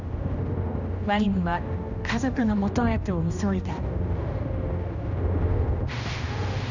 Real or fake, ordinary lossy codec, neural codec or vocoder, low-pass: fake; none; codec, 16 kHz, 1 kbps, X-Codec, HuBERT features, trained on general audio; 7.2 kHz